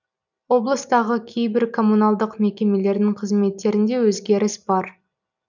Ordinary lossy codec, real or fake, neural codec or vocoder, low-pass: none; real; none; 7.2 kHz